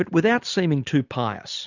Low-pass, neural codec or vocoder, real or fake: 7.2 kHz; none; real